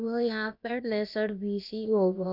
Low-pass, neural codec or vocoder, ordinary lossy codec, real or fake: 5.4 kHz; codec, 16 kHz, about 1 kbps, DyCAST, with the encoder's durations; none; fake